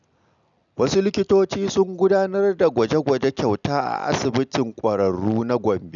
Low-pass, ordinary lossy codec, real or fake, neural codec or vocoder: 7.2 kHz; none; real; none